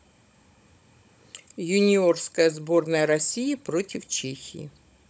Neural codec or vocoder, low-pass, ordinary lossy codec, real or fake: codec, 16 kHz, 16 kbps, FunCodec, trained on Chinese and English, 50 frames a second; none; none; fake